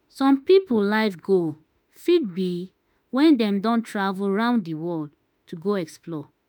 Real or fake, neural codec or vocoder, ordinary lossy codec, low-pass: fake; autoencoder, 48 kHz, 32 numbers a frame, DAC-VAE, trained on Japanese speech; none; none